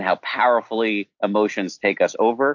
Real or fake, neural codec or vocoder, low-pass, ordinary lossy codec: real; none; 7.2 kHz; MP3, 48 kbps